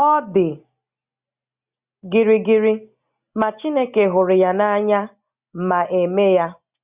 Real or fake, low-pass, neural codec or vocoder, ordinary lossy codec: real; 3.6 kHz; none; Opus, 64 kbps